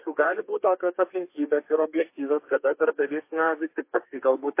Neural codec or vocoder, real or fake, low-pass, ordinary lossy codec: codec, 32 kHz, 1.9 kbps, SNAC; fake; 3.6 kHz; MP3, 24 kbps